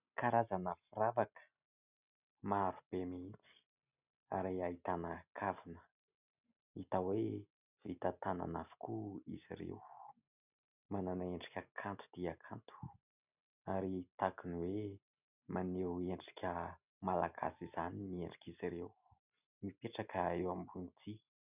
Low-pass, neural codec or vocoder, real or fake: 3.6 kHz; none; real